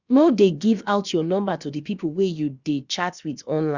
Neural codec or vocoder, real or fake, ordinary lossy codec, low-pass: codec, 16 kHz, about 1 kbps, DyCAST, with the encoder's durations; fake; Opus, 64 kbps; 7.2 kHz